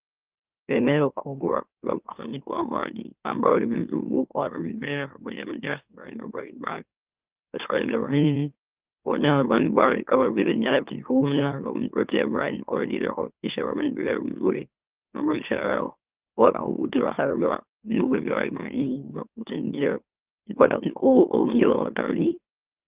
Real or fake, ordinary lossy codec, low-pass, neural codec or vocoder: fake; Opus, 32 kbps; 3.6 kHz; autoencoder, 44.1 kHz, a latent of 192 numbers a frame, MeloTTS